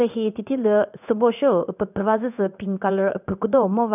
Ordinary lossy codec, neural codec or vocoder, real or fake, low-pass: none; codec, 16 kHz in and 24 kHz out, 1 kbps, XY-Tokenizer; fake; 3.6 kHz